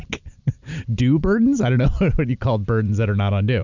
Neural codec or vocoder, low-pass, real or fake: none; 7.2 kHz; real